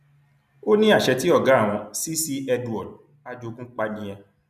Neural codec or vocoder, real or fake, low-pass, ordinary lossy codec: none; real; 14.4 kHz; none